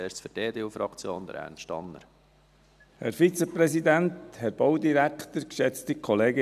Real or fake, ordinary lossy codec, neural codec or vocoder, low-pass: real; none; none; 14.4 kHz